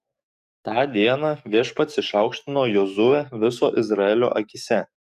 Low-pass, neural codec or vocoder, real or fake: 14.4 kHz; codec, 44.1 kHz, 7.8 kbps, DAC; fake